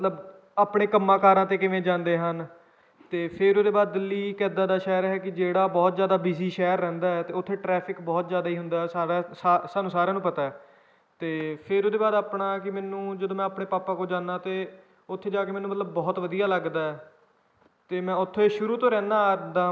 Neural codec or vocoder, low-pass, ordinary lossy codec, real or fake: none; none; none; real